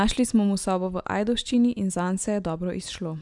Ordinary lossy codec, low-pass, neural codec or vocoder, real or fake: none; 10.8 kHz; none; real